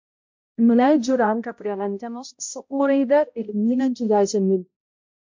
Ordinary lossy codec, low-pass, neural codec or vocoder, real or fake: MP3, 48 kbps; 7.2 kHz; codec, 16 kHz, 0.5 kbps, X-Codec, HuBERT features, trained on balanced general audio; fake